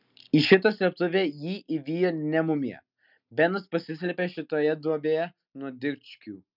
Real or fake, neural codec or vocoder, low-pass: real; none; 5.4 kHz